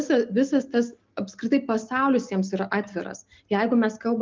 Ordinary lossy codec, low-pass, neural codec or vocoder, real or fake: Opus, 24 kbps; 7.2 kHz; none; real